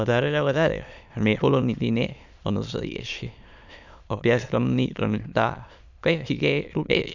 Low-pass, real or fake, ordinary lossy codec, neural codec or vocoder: 7.2 kHz; fake; none; autoencoder, 22.05 kHz, a latent of 192 numbers a frame, VITS, trained on many speakers